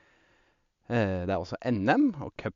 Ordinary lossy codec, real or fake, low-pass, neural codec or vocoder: MP3, 64 kbps; real; 7.2 kHz; none